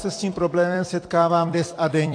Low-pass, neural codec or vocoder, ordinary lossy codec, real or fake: 9.9 kHz; vocoder, 44.1 kHz, 128 mel bands every 256 samples, BigVGAN v2; AAC, 48 kbps; fake